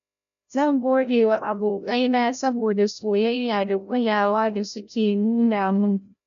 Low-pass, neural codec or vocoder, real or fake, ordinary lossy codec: 7.2 kHz; codec, 16 kHz, 0.5 kbps, FreqCodec, larger model; fake; none